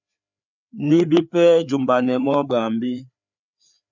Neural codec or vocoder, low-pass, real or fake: codec, 16 kHz, 4 kbps, FreqCodec, larger model; 7.2 kHz; fake